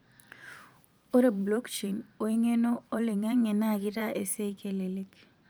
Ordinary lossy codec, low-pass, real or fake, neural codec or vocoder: none; none; fake; vocoder, 44.1 kHz, 128 mel bands every 512 samples, BigVGAN v2